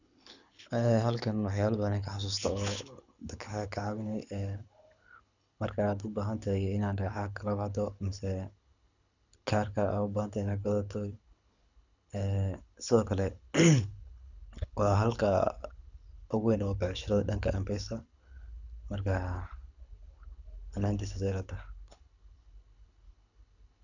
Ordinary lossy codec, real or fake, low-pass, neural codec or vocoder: none; fake; 7.2 kHz; codec, 24 kHz, 6 kbps, HILCodec